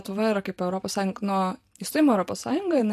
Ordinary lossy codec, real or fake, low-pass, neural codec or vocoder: MP3, 64 kbps; real; 14.4 kHz; none